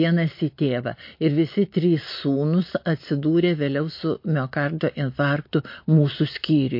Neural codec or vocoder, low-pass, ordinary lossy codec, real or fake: none; 5.4 kHz; MP3, 32 kbps; real